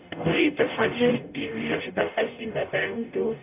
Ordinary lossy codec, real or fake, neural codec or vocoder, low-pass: none; fake; codec, 44.1 kHz, 0.9 kbps, DAC; 3.6 kHz